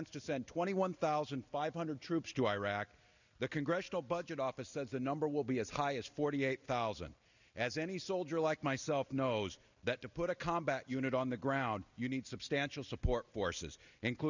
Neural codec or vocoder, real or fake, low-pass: none; real; 7.2 kHz